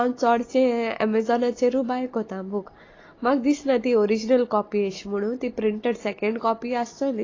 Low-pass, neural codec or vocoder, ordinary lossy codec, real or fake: 7.2 kHz; none; AAC, 32 kbps; real